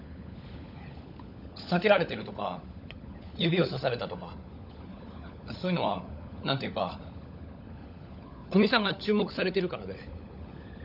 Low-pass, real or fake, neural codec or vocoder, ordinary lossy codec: 5.4 kHz; fake; codec, 16 kHz, 16 kbps, FunCodec, trained on LibriTTS, 50 frames a second; none